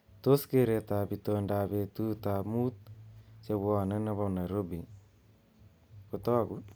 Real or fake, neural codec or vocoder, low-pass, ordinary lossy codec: real; none; none; none